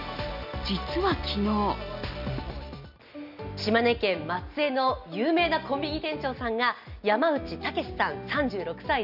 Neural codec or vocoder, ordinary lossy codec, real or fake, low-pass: none; none; real; 5.4 kHz